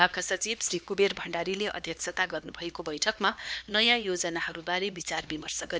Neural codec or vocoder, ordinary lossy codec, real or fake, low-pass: codec, 16 kHz, 2 kbps, X-Codec, HuBERT features, trained on LibriSpeech; none; fake; none